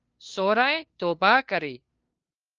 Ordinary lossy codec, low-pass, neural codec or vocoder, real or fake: Opus, 24 kbps; 7.2 kHz; codec, 16 kHz, 2 kbps, FunCodec, trained on LibriTTS, 25 frames a second; fake